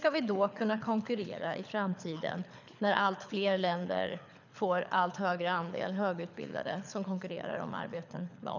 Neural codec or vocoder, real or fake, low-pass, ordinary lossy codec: codec, 24 kHz, 6 kbps, HILCodec; fake; 7.2 kHz; none